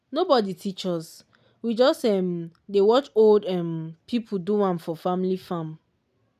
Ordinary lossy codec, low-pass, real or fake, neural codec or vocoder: none; 14.4 kHz; real; none